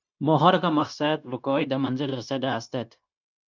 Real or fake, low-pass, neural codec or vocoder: fake; 7.2 kHz; codec, 16 kHz, 0.9 kbps, LongCat-Audio-Codec